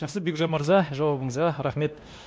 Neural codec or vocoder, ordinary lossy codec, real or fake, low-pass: codec, 16 kHz, 1 kbps, X-Codec, WavLM features, trained on Multilingual LibriSpeech; none; fake; none